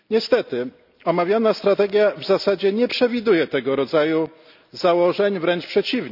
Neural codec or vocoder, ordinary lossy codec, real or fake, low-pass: none; none; real; 5.4 kHz